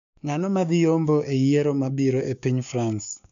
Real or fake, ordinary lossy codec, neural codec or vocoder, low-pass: fake; none; codec, 16 kHz, 6 kbps, DAC; 7.2 kHz